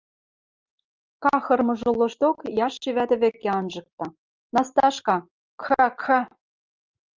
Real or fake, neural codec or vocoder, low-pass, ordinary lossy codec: real; none; 7.2 kHz; Opus, 24 kbps